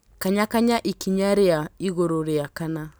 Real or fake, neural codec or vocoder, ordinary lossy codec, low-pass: real; none; none; none